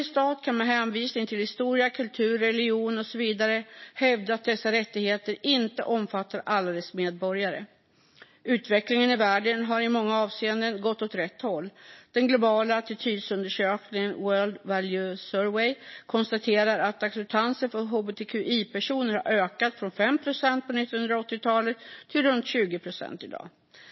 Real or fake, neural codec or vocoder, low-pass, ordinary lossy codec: real; none; 7.2 kHz; MP3, 24 kbps